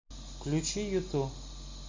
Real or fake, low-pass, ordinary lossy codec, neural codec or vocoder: real; 7.2 kHz; MP3, 64 kbps; none